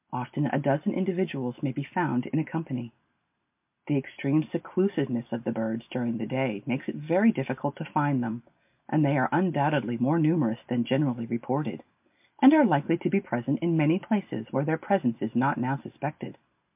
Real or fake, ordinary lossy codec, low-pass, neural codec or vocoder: real; MP3, 32 kbps; 3.6 kHz; none